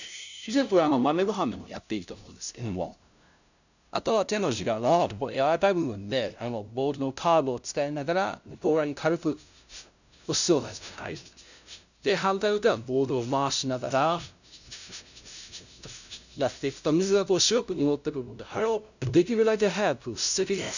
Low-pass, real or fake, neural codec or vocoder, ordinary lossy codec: 7.2 kHz; fake; codec, 16 kHz, 0.5 kbps, FunCodec, trained on LibriTTS, 25 frames a second; none